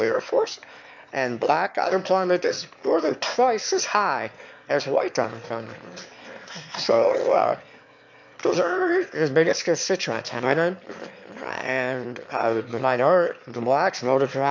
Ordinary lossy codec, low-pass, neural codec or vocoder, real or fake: MP3, 64 kbps; 7.2 kHz; autoencoder, 22.05 kHz, a latent of 192 numbers a frame, VITS, trained on one speaker; fake